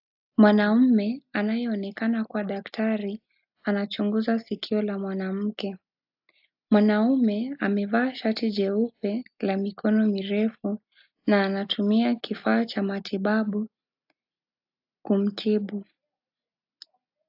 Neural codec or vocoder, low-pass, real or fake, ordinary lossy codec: none; 5.4 kHz; real; AAC, 48 kbps